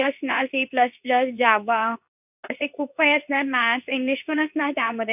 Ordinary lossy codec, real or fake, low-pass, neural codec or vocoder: none; fake; 3.6 kHz; codec, 24 kHz, 0.9 kbps, WavTokenizer, medium speech release version 2